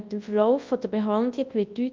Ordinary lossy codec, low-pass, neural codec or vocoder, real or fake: Opus, 24 kbps; 7.2 kHz; codec, 24 kHz, 0.9 kbps, WavTokenizer, large speech release; fake